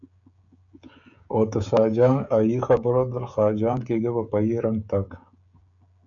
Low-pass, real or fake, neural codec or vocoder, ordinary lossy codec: 7.2 kHz; fake; codec, 16 kHz, 16 kbps, FreqCodec, smaller model; MP3, 96 kbps